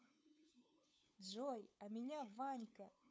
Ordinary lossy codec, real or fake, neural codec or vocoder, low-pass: none; fake; codec, 16 kHz, 8 kbps, FunCodec, trained on LibriTTS, 25 frames a second; none